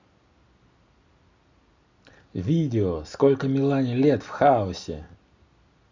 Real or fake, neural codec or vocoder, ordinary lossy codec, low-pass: real; none; Opus, 64 kbps; 7.2 kHz